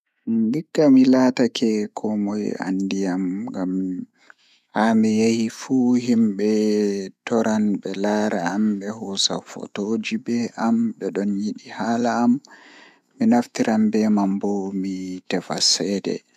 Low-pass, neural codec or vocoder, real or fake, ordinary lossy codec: 14.4 kHz; autoencoder, 48 kHz, 128 numbers a frame, DAC-VAE, trained on Japanese speech; fake; none